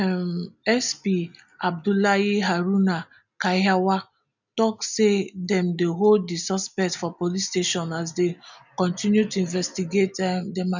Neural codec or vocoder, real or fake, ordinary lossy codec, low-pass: none; real; none; 7.2 kHz